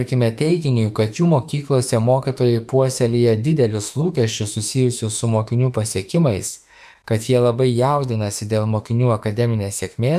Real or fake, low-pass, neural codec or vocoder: fake; 14.4 kHz; autoencoder, 48 kHz, 32 numbers a frame, DAC-VAE, trained on Japanese speech